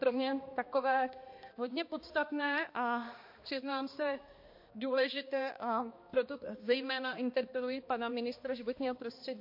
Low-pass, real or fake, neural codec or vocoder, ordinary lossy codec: 5.4 kHz; fake; codec, 16 kHz, 2 kbps, X-Codec, HuBERT features, trained on balanced general audio; MP3, 32 kbps